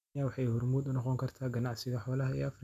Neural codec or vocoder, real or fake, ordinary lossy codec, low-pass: none; real; none; 14.4 kHz